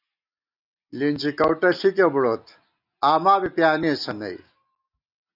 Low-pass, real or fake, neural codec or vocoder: 5.4 kHz; real; none